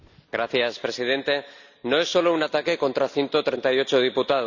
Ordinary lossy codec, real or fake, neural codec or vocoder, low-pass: none; real; none; 7.2 kHz